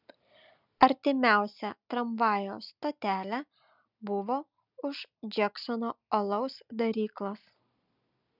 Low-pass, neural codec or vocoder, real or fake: 5.4 kHz; none; real